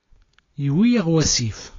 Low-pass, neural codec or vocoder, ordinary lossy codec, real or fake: 7.2 kHz; none; AAC, 32 kbps; real